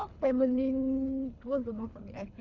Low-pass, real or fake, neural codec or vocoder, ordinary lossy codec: 7.2 kHz; fake; codec, 24 kHz, 3 kbps, HILCodec; none